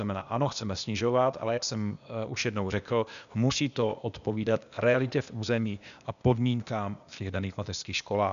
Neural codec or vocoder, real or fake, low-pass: codec, 16 kHz, 0.8 kbps, ZipCodec; fake; 7.2 kHz